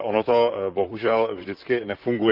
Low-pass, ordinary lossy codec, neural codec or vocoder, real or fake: 5.4 kHz; Opus, 32 kbps; vocoder, 44.1 kHz, 128 mel bands, Pupu-Vocoder; fake